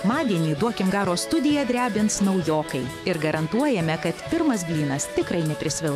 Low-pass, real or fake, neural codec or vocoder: 14.4 kHz; fake; autoencoder, 48 kHz, 128 numbers a frame, DAC-VAE, trained on Japanese speech